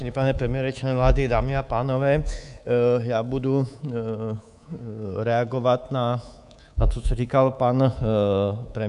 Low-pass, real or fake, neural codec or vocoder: 10.8 kHz; fake; codec, 24 kHz, 3.1 kbps, DualCodec